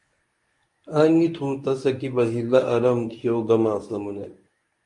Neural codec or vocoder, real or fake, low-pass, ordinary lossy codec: codec, 24 kHz, 0.9 kbps, WavTokenizer, medium speech release version 1; fake; 10.8 kHz; MP3, 48 kbps